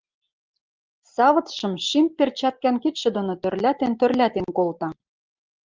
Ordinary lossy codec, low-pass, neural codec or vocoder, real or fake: Opus, 32 kbps; 7.2 kHz; none; real